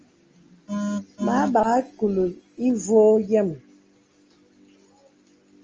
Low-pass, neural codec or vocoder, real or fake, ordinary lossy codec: 7.2 kHz; none; real; Opus, 24 kbps